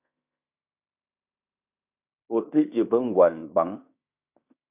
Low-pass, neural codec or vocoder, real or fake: 3.6 kHz; codec, 16 kHz in and 24 kHz out, 0.9 kbps, LongCat-Audio-Codec, fine tuned four codebook decoder; fake